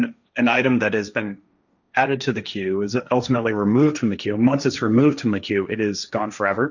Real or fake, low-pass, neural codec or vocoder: fake; 7.2 kHz; codec, 16 kHz, 1.1 kbps, Voila-Tokenizer